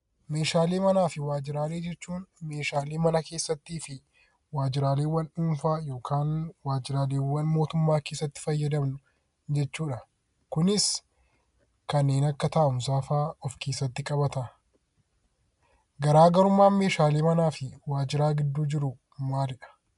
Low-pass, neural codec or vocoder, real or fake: 10.8 kHz; none; real